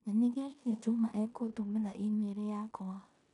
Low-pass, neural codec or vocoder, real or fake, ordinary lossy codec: 10.8 kHz; codec, 16 kHz in and 24 kHz out, 0.9 kbps, LongCat-Audio-Codec, four codebook decoder; fake; none